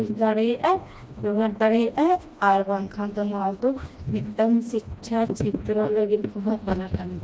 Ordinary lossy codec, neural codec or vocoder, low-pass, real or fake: none; codec, 16 kHz, 1 kbps, FreqCodec, smaller model; none; fake